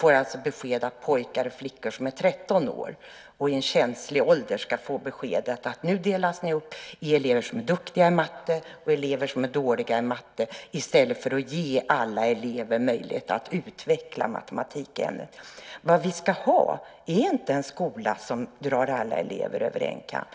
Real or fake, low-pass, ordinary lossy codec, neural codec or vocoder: real; none; none; none